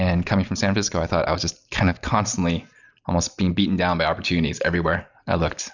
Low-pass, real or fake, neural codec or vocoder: 7.2 kHz; real; none